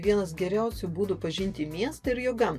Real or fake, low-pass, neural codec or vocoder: real; 14.4 kHz; none